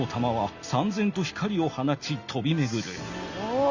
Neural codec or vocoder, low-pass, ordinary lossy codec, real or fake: none; 7.2 kHz; Opus, 64 kbps; real